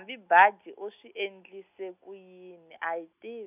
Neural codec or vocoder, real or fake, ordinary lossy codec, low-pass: none; real; none; 3.6 kHz